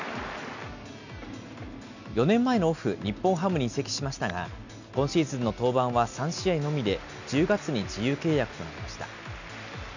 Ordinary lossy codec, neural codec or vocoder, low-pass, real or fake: none; none; 7.2 kHz; real